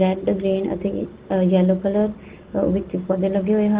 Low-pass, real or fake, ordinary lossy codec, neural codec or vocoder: 3.6 kHz; real; Opus, 16 kbps; none